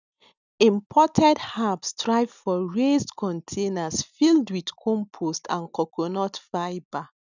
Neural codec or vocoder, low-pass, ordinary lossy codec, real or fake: none; 7.2 kHz; none; real